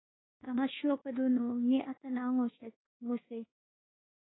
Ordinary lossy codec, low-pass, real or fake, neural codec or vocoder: AAC, 16 kbps; 7.2 kHz; fake; codec, 24 kHz, 1.2 kbps, DualCodec